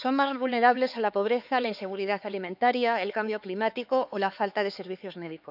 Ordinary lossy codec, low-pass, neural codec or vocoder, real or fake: none; 5.4 kHz; codec, 16 kHz, 4 kbps, X-Codec, HuBERT features, trained on LibriSpeech; fake